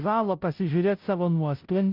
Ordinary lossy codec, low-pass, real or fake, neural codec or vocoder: Opus, 32 kbps; 5.4 kHz; fake; codec, 16 kHz, 0.5 kbps, FunCodec, trained on Chinese and English, 25 frames a second